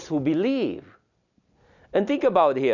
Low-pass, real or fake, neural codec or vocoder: 7.2 kHz; real; none